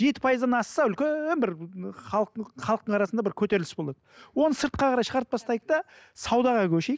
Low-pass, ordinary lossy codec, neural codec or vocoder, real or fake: none; none; none; real